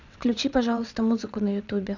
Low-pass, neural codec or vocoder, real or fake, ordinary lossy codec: 7.2 kHz; vocoder, 22.05 kHz, 80 mel bands, WaveNeXt; fake; none